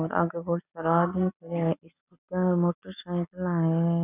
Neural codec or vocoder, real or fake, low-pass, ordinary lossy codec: none; real; 3.6 kHz; none